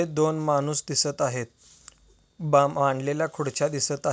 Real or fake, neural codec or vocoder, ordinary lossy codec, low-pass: real; none; none; none